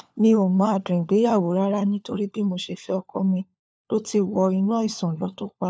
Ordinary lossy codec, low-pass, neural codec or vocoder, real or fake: none; none; codec, 16 kHz, 4 kbps, FunCodec, trained on LibriTTS, 50 frames a second; fake